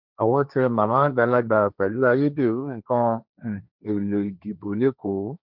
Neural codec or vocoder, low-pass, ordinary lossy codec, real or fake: codec, 16 kHz, 1.1 kbps, Voila-Tokenizer; 5.4 kHz; none; fake